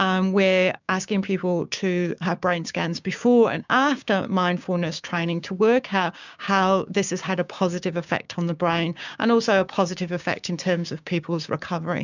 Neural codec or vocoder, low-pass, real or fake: codec, 16 kHz, 6 kbps, DAC; 7.2 kHz; fake